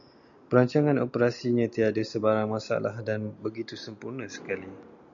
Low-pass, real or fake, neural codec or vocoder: 7.2 kHz; real; none